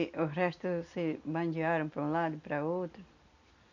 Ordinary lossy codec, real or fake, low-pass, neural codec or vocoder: none; real; 7.2 kHz; none